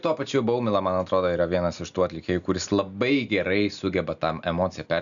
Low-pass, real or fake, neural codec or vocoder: 7.2 kHz; real; none